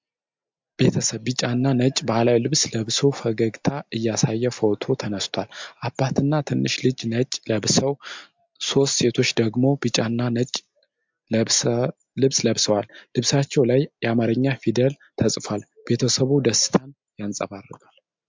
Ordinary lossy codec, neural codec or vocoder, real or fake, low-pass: MP3, 64 kbps; none; real; 7.2 kHz